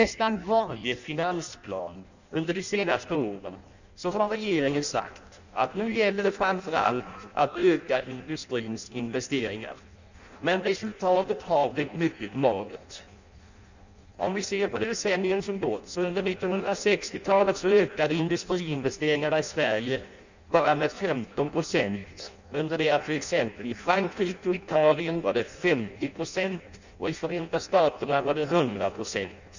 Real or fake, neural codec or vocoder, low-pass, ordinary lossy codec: fake; codec, 16 kHz in and 24 kHz out, 0.6 kbps, FireRedTTS-2 codec; 7.2 kHz; none